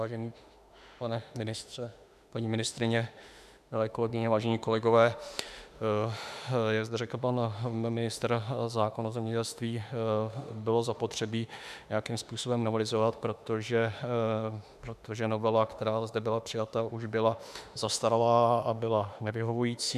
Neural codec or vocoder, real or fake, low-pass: autoencoder, 48 kHz, 32 numbers a frame, DAC-VAE, trained on Japanese speech; fake; 14.4 kHz